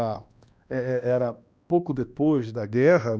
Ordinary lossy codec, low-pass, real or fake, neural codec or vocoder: none; none; fake; codec, 16 kHz, 1 kbps, X-Codec, HuBERT features, trained on balanced general audio